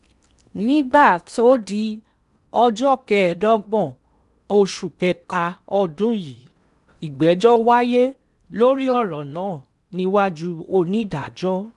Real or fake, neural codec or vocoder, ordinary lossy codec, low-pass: fake; codec, 16 kHz in and 24 kHz out, 0.8 kbps, FocalCodec, streaming, 65536 codes; none; 10.8 kHz